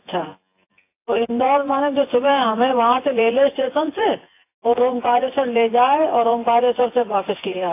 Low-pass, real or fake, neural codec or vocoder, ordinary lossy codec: 3.6 kHz; fake; vocoder, 24 kHz, 100 mel bands, Vocos; AAC, 24 kbps